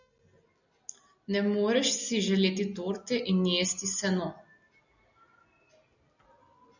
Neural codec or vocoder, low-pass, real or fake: none; 7.2 kHz; real